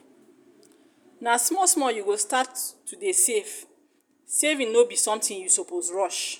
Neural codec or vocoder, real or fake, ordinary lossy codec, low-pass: none; real; none; none